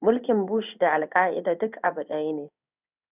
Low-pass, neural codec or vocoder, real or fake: 3.6 kHz; codec, 16 kHz in and 24 kHz out, 1 kbps, XY-Tokenizer; fake